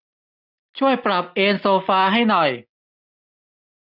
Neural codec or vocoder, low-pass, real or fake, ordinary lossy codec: none; 5.4 kHz; real; none